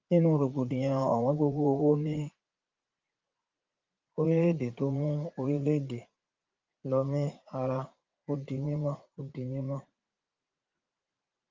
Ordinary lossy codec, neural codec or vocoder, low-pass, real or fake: Opus, 24 kbps; vocoder, 44.1 kHz, 80 mel bands, Vocos; 7.2 kHz; fake